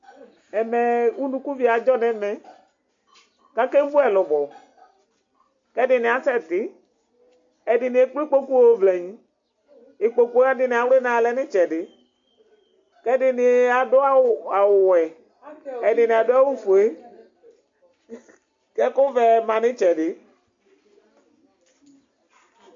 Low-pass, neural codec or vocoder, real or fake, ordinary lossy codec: 7.2 kHz; none; real; MP3, 48 kbps